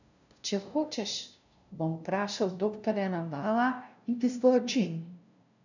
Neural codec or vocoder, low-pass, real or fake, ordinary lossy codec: codec, 16 kHz, 0.5 kbps, FunCodec, trained on LibriTTS, 25 frames a second; 7.2 kHz; fake; none